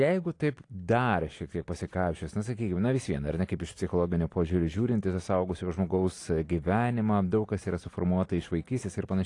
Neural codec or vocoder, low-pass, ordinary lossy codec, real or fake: none; 10.8 kHz; AAC, 48 kbps; real